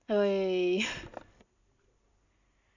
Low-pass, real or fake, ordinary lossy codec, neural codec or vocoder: 7.2 kHz; real; none; none